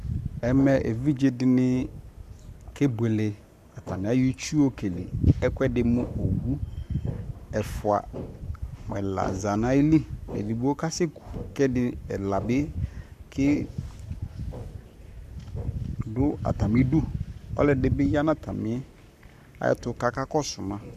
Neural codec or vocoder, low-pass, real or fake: codec, 44.1 kHz, 7.8 kbps, Pupu-Codec; 14.4 kHz; fake